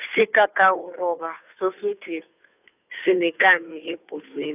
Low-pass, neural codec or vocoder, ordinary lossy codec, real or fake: 3.6 kHz; codec, 16 kHz, 2 kbps, FunCodec, trained on Chinese and English, 25 frames a second; none; fake